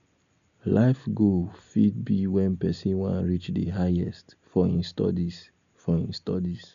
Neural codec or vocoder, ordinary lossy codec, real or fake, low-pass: none; none; real; 7.2 kHz